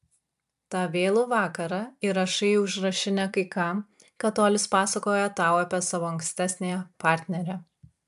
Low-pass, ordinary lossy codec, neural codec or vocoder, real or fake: 14.4 kHz; AAC, 96 kbps; vocoder, 44.1 kHz, 128 mel bands every 256 samples, BigVGAN v2; fake